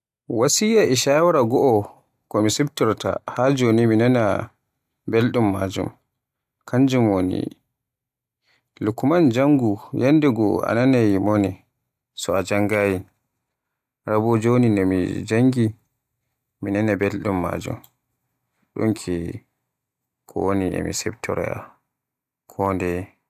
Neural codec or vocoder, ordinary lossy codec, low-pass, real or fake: none; AAC, 96 kbps; 14.4 kHz; real